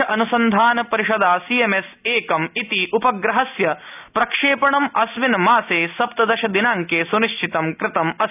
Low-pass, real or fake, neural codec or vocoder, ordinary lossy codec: 3.6 kHz; real; none; none